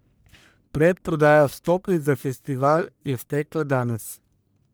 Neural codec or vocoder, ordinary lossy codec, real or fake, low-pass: codec, 44.1 kHz, 1.7 kbps, Pupu-Codec; none; fake; none